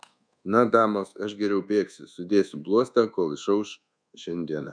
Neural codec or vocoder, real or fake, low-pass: codec, 24 kHz, 1.2 kbps, DualCodec; fake; 9.9 kHz